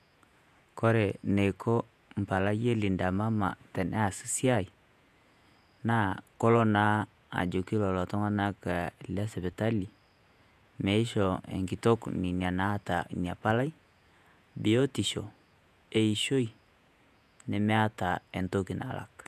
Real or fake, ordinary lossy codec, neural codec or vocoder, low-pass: fake; none; vocoder, 48 kHz, 128 mel bands, Vocos; 14.4 kHz